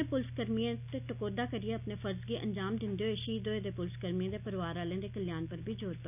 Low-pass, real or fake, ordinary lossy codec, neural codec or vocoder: 3.6 kHz; real; none; none